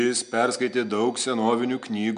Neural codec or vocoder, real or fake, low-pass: none; real; 9.9 kHz